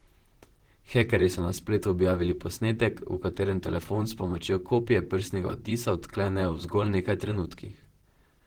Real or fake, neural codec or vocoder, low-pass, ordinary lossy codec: fake; vocoder, 44.1 kHz, 128 mel bands, Pupu-Vocoder; 19.8 kHz; Opus, 16 kbps